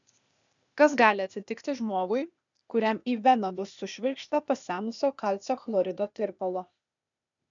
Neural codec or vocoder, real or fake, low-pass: codec, 16 kHz, 0.8 kbps, ZipCodec; fake; 7.2 kHz